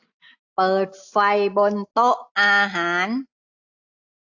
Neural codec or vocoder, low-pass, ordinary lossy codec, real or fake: none; 7.2 kHz; AAC, 48 kbps; real